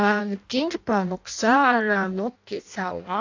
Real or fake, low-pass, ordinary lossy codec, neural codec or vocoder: fake; 7.2 kHz; AAC, 48 kbps; codec, 16 kHz in and 24 kHz out, 0.6 kbps, FireRedTTS-2 codec